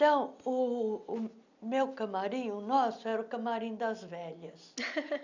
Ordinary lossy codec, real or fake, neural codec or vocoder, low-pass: none; real; none; 7.2 kHz